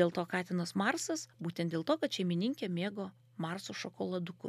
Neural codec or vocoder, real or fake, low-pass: none; real; 14.4 kHz